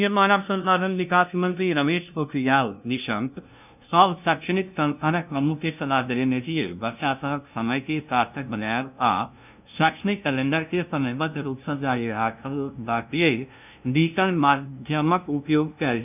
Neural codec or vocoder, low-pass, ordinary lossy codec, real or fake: codec, 16 kHz, 0.5 kbps, FunCodec, trained on LibriTTS, 25 frames a second; 3.6 kHz; none; fake